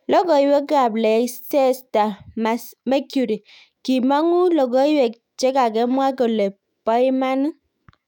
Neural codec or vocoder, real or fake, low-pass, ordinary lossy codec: autoencoder, 48 kHz, 128 numbers a frame, DAC-VAE, trained on Japanese speech; fake; 19.8 kHz; none